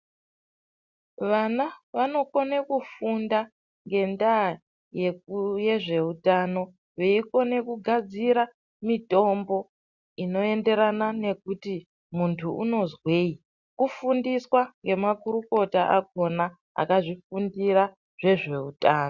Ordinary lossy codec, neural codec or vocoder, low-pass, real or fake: Opus, 64 kbps; none; 7.2 kHz; real